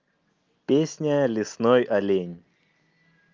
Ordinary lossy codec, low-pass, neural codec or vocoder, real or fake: Opus, 32 kbps; 7.2 kHz; none; real